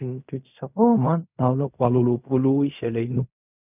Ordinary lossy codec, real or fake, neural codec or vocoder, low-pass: none; fake; codec, 16 kHz in and 24 kHz out, 0.4 kbps, LongCat-Audio-Codec, fine tuned four codebook decoder; 3.6 kHz